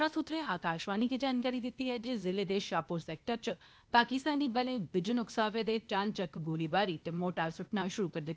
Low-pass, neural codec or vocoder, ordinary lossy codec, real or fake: none; codec, 16 kHz, 0.8 kbps, ZipCodec; none; fake